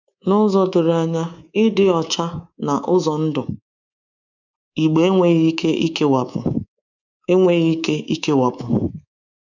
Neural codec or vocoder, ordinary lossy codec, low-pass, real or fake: codec, 24 kHz, 3.1 kbps, DualCodec; none; 7.2 kHz; fake